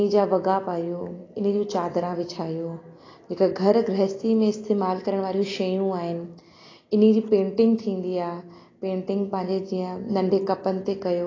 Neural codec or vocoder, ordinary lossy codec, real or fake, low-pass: none; AAC, 32 kbps; real; 7.2 kHz